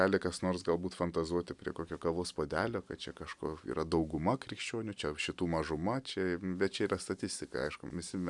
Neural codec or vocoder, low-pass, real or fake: none; 10.8 kHz; real